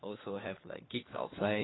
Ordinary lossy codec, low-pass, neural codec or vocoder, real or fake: AAC, 16 kbps; 7.2 kHz; codec, 16 kHz, 4 kbps, FunCodec, trained on LibriTTS, 50 frames a second; fake